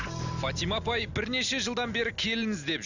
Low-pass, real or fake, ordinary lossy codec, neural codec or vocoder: 7.2 kHz; real; none; none